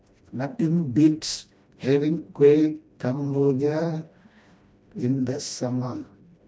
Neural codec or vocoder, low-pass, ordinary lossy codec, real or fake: codec, 16 kHz, 1 kbps, FreqCodec, smaller model; none; none; fake